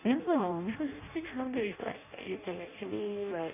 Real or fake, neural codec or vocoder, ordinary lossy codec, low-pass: fake; codec, 16 kHz in and 24 kHz out, 0.6 kbps, FireRedTTS-2 codec; none; 3.6 kHz